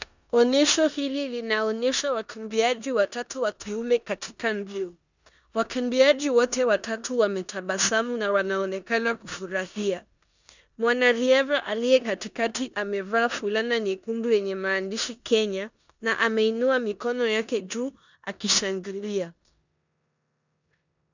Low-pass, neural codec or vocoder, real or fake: 7.2 kHz; codec, 16 kHz in and 24 kHz out, 0.9 kbps, LongCat-Audio-Codec, four codebook decoder; fake